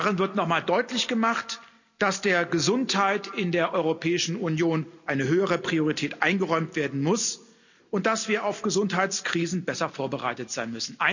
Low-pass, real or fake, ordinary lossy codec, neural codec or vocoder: 7.2 kHz; real; none; none